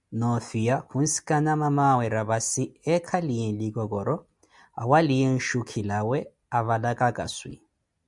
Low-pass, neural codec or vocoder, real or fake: 10.8 kHz; none; real